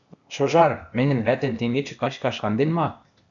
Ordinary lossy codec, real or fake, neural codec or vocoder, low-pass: MP3, 96 kbps; fake; codec, 16 kHz, 0.8 kbps, ZipCodec; 7.2 kHz